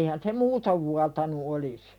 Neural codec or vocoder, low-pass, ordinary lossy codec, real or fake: none; 19.8 kHz; none; real